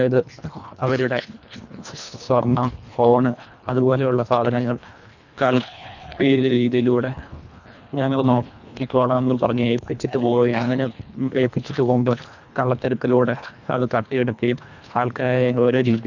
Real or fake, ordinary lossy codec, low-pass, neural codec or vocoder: fake; none; 7.2 kHz; codec, 24 kHz, 1.5 kbps, HILCodec